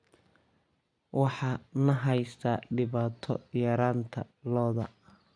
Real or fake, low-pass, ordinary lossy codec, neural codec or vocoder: real; 9.9 kHz; none; none